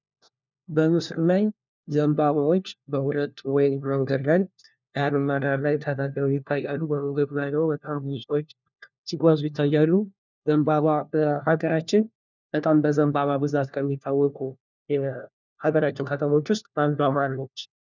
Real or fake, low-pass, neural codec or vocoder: fake; 7.2 kHz; codec, 16 kHz, 1 kbps, FunCodec, trained on LibriTTS, 50 frames a second